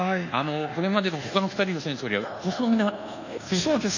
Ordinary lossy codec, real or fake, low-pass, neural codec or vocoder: none; fake; 7.2 kHz; codec, 24 kHz, 1.2 kbps, DualCodec